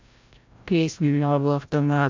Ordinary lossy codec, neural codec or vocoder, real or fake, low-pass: MP3, 64 kbps; codec, 16 kHz, 0.5 kbps, FreqCodec, larger model; fake; 7.2 kHz